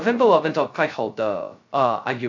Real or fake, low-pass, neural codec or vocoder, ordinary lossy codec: fake; 7.2 kHz; codec, 16 kHz, 0.2 kbps, FocalCodec; AAC, 48 kbps